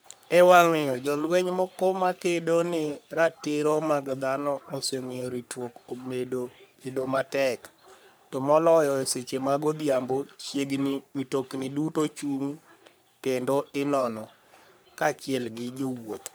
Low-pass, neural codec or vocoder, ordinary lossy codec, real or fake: none; codec, 44.1 kHz, 3.4 kbps, Pupu-Codec; none; fake